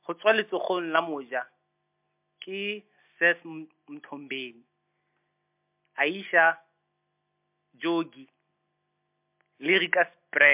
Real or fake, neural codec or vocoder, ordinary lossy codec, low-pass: real; none; MP3, 32 kbps; 3.6 kHz